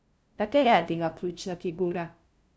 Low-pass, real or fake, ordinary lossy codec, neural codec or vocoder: none; fake; none; codec, 16 kHz, 0.5 kbps, FunCodec, trained on LibriTTS, 25 frames a second